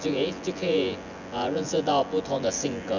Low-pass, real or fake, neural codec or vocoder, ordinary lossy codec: 7.2 kHz; fake; vocoder, 24 kHz, 100 mel bands, Vocos; none